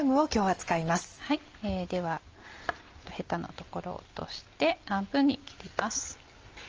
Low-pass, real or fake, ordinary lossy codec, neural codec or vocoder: 7.2 kHz; real; Opus, 24 kbps; none